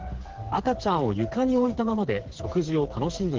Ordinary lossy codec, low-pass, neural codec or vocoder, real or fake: Opus, 16 kbps; 7.2 kHz; codec, 16 kHz, 4 kbps, FreqCodec, smaller model; fake